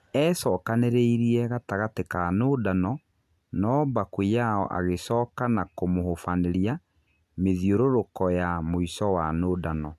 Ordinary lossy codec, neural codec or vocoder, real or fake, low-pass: none; none; real; 14.4 kHz